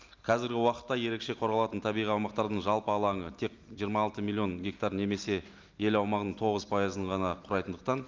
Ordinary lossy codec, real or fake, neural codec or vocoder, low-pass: Opus, 32 kbps; real; none; 7.2 kHz